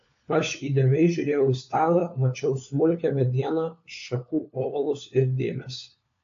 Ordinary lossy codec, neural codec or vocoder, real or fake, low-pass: AAC, 48 kbps; codec, 16 kHz, 4 kbps, FunCodec, trained on LibriTTS, 50 frames a second; fake; 7.2 kHz